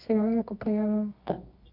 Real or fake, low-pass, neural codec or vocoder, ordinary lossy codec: fake; 5.4 kHz; codec, 24 kHz, 0.9 kbps, WavTokenizer, medium music audio release; Opus, 64 kbps